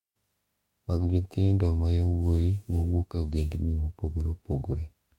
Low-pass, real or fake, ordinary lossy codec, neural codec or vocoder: 19.8 kHz; fake; MP3, 64 kbps; autoencoder, 48 kHz, 32 numbers a frame, DAC-VAE, trained on Japanese speech